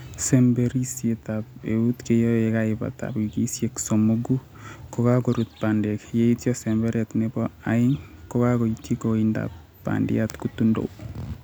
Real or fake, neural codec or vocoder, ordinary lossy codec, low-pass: real; none; none; none